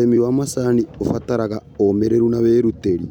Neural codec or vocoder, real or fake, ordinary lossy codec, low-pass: none; real; MP3, 96 kbps; 19.8 kHz